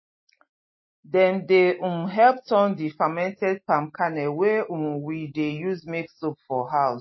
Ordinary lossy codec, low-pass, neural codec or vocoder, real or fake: MP3, 24 kbps; 7.2 kHz; none; real